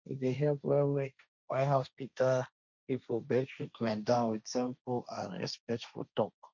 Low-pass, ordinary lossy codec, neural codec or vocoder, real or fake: 7.2 kHz; none; codec, 16 kHz, 1.1 kbps, Voila-Tokenizer; fake